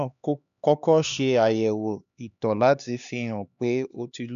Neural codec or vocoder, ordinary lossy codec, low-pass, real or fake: codec, 16 kHz, 2 kbps, X-Codec, HuBERT features, trained on LibriSpeech; none; 7.2 kHz; fake